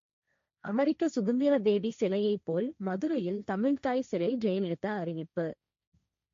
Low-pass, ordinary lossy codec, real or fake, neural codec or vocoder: 7.2 kHz; MP3, 48 kbps; fake; codec, 16 kHz, 1.1 kbps, Voila-Tokenizer